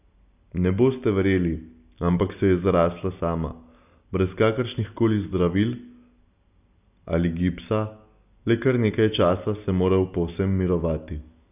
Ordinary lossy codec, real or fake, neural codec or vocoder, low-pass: none; real; none; 3.6 kHz